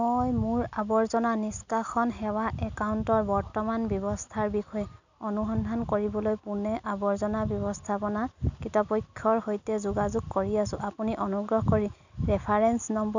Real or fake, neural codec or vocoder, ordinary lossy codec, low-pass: real; none; none; 7.2 kHz